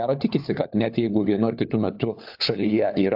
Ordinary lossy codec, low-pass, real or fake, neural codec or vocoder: AAC, 48 kbps; 5.4 kHz; fake; codec, 16 kHz, 4 kbps, FunCodec, trained on LibriTTS, 50 frames a second